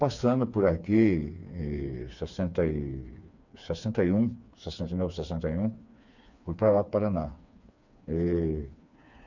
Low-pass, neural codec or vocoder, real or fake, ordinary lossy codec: 7.2 kHz; codec, 16 kHz, 4 kbps, FreqCodec, smaller model; fake; none